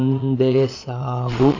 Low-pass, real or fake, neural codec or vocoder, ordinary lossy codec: 7.2 kHz; fake; vocoder, 22.05 kHz, 80 mel bands, Vocos; AAC, 48 kbps